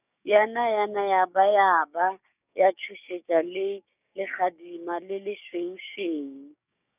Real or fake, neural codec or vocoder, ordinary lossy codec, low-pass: fake; codec, 16 kHz, 6 kbps, DAC; none; 3.6 kHz